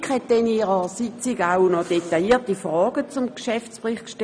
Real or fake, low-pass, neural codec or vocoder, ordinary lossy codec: real; 9.9 kHz; none; none